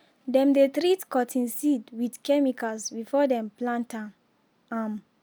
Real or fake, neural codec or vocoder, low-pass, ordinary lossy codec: real; none; none; none